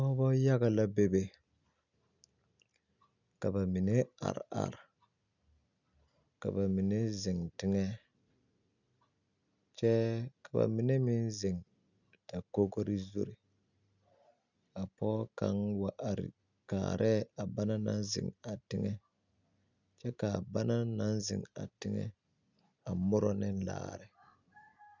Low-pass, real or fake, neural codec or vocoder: 7.2 kHz; real; none